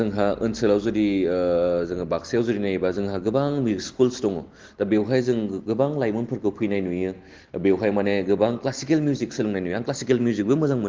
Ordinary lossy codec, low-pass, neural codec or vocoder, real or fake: Opus, 16 kbps; 7.2 kHz; none; real